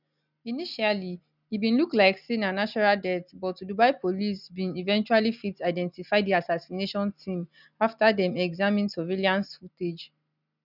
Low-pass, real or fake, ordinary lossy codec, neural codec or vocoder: 5.4 kHz; real; none; none